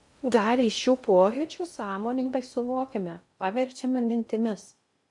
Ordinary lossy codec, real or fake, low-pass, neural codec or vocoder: MP3, 64 kbps; fake; 10.8 kHz; codec, 16 kHz in and 24 kHz out, 0.6 kbps, FocalCodec, streaming, 2048 codes